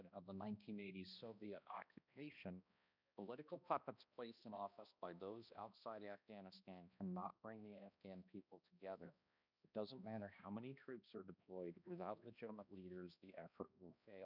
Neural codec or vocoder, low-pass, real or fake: codec, 16 kHz, 1 kbps, X-Codec, HuBERT features, trained on balanced general audio; 5.4 kHz; fake